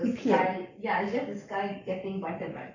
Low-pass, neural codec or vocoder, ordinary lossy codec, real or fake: 7.2 kHz; codec, 44.1 kHz, 7.8 kbps, Pupu-Codec; none; fake